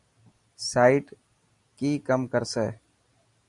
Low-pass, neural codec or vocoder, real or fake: 10.8 kHz; none; real